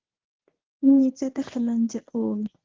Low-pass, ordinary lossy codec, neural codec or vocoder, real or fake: 7.2 kHz; Opus, 16 kbps; codec, 24 kHz, 0.9 kbps, WavTokenizer, medium speech release version 1; fake